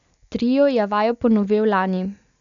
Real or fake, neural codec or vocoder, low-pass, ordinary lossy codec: real; none; 7.2 kHz; none